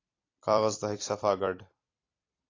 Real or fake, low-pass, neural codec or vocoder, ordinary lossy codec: fake; 7.2 kHz; vocoder, 44.1 kHz, 128 mel bands every 256 samples, BigVGAN v2; AAC, 32 kbps